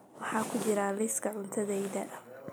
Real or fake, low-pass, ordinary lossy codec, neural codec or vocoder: real; none; none; none